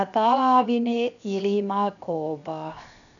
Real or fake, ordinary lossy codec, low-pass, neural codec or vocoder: fake; none; 7.2 kHz; codec, 16 kHz, 0.7 kbps, FocalCodec